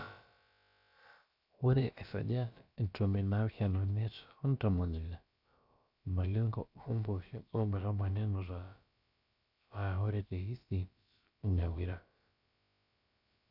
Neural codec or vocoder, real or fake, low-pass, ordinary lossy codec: codec, 16 kHz, about 1 kbps, DyCAST, with the encoder's durations; fake; 5.4 kHz; none